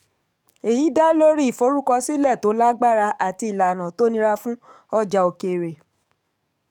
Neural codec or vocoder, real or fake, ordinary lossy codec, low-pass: codec, 44.1 kHz, 7.8 kbps, DAC; fake; none; 19.8 kHz